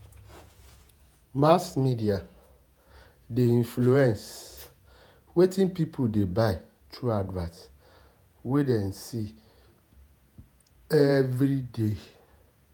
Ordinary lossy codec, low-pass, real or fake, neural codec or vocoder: none; none; fake; vocoder, 48 kHz, 128 mel bands, Vocos